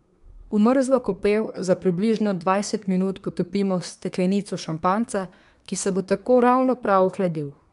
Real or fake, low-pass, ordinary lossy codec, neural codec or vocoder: fake; 10.8 kHz; none; codec, 24 kHz, 1 kbps, SNAC